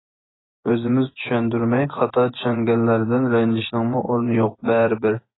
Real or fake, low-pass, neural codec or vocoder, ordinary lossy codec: fake; 7.2 kHz; vocoder, 24 kHz, 100 mel bands, Vocos; AAC, 16 kbps